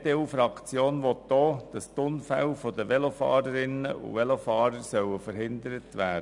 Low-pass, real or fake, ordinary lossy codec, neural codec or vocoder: 10.8 kHz; real; none; none